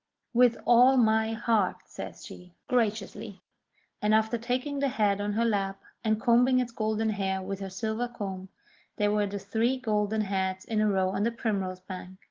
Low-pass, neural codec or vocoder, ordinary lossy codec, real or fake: 7.2 kHz; none; Opus, 16 kbps; real